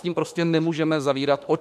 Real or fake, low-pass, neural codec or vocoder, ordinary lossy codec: fake; 14.4 kHz; autoencoder, 48 kHz, 32 numbers a frame, DAC-VAE, trained on Japanese speech; MP3, 96 kbps